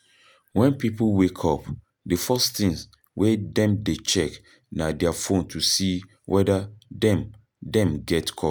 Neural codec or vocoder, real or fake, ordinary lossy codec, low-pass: none; real; none; none